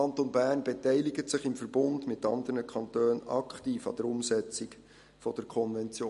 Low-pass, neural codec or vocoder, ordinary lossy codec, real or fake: 14.4 kHz; none; MP3, 48 kbps; real